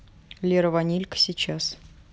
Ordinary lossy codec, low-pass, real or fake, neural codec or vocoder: none; none; real; none